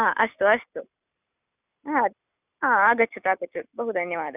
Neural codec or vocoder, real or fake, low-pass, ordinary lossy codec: none; real; 3.6 kHz; none